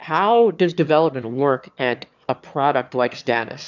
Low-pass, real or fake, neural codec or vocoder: 7.2 kHz; fake; autoencoder, 22.05 kHz, a latent of 192 numbers a frame, VITS, trained on one speaker